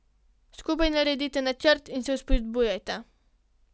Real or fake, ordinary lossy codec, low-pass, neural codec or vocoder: real; none; none; none